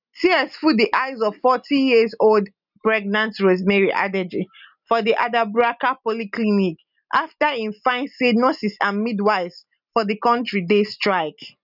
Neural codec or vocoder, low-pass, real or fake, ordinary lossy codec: none; 5.4 kHz; real; none